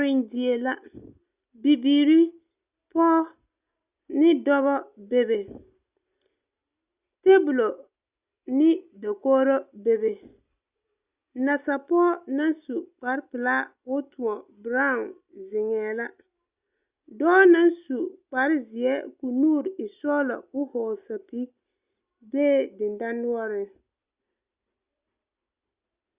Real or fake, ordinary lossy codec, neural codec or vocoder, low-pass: real; Opus, 64 kbps; none; 3.6 kHz